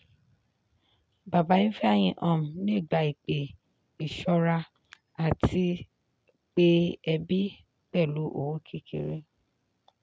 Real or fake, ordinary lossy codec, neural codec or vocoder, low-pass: real; none; none; none